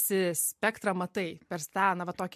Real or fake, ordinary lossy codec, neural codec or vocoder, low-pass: real; MP3, 64 kbps; none; 14.4 kHz